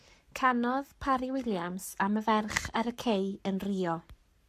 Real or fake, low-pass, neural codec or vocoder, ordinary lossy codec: fake; 14.4 kHz; codec, 44.1 kHz, 7.8 kbps, Pupu-Codec; MP3, 96 kbps